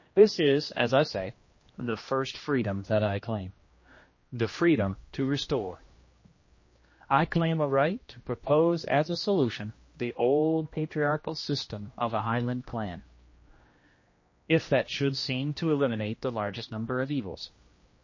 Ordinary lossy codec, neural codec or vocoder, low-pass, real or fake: MP3, 32 kbps; codec, 16 kHz, 1 kbps, X-Codec, HuBERT features, trained on general audio; 7.2 kHz; fake